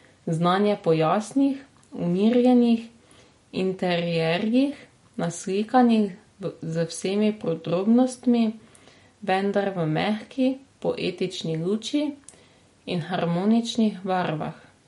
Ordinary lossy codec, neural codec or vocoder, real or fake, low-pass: MP3, 48 kbps; none; real; 19.8 kHz